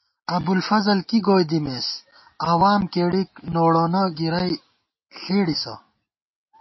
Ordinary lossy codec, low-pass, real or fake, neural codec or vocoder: MP3, 24 kbps; 7.2 kHz; real; none